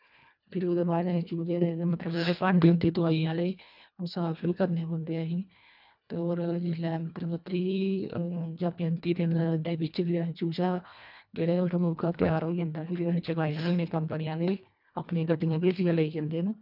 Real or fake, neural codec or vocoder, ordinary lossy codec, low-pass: fake; codec, 24 kHz, 1.5 kbps, HILCodec; none; 5.4 kHz